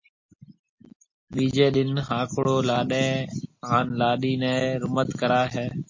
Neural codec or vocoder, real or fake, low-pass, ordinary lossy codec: none; real; 7.2 kHz; MP3, 32 kbps